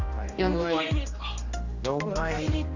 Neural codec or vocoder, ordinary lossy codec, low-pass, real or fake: codec, 16 kHz, 1 kbps, X-Codec, HuBERT features, trained on general audio; none; 7.2 kHz; fake